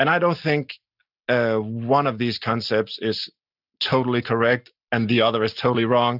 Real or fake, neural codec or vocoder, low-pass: real; none; 5.4 kHz